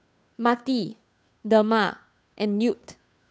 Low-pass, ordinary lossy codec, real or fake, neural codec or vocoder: none; none; fake; codec, 16 kHz, 2 kbps, FunCodec, trained on Chinese and English, 25 frames a second